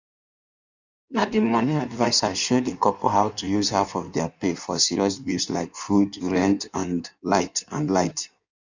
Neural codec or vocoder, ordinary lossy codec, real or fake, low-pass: codec, 16 kHz in and 24 kHz out, 1.1 kbps, FireRedTTS-2 codec; none; fake; 7.2 kHz